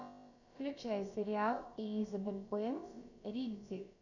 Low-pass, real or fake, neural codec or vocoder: 7.2 kHz; fake; codec, 16 kHz, about 1 kbps, DyCAST, with the encoder's durations